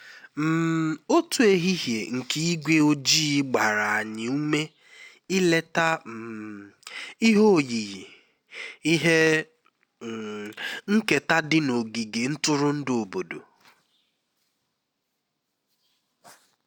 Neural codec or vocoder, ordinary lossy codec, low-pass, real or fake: none; none; 19.8 kHz; real